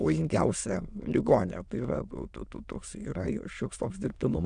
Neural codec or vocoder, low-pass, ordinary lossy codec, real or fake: autoencoder, 22.05 kHz, a latent of 192 numbers a frame, VITS, trained on many speakers; 9.9 kHz; MP3, 96 kbps; fake